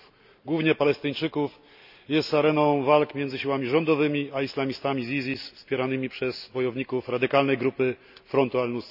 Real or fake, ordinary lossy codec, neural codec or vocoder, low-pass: real; none; none; 5.4 kHz